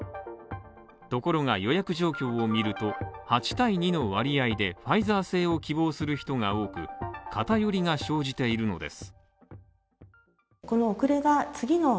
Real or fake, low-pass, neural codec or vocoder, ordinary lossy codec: real; none; none; none